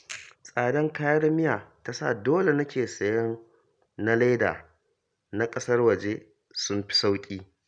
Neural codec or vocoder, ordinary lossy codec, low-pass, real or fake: none; none; 9.9 kHz; real